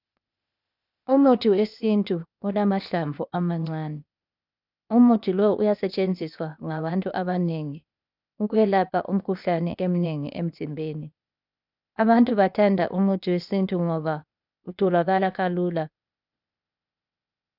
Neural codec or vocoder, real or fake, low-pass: codec, 16 kHz, 0.8 kbps, ZipCodec; fake; 5.4 kHz